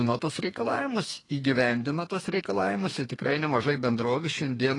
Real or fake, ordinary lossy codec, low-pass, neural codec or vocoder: fake; AAC, 32 kbps; 10.8 kHz; codec, 32 kHz, 1.9 kbps, SNAC